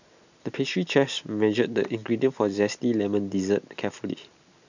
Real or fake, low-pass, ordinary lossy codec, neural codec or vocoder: real; 7.2 kHz; none; none